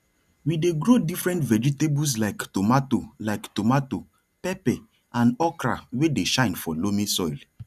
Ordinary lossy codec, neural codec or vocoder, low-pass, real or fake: none; none; 14.4 kHz; real